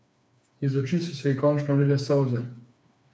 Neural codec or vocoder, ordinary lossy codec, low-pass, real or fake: codec, 16 kHz, 4 kbps, FreqCodec, smaller model; none; none; fake